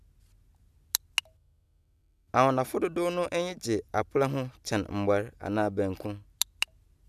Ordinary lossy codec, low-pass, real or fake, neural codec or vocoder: none; 14.4 kHz; real; none